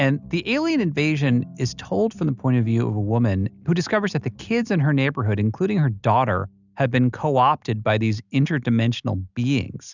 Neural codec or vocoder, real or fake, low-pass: none; real; 7.2 kHz